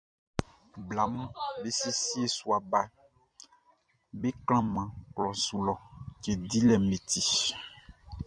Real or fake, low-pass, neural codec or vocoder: fake; 9.9 kHz; vocoder, 44.1 kHz, 128 mel bands every 256 samples, BigVGAN v2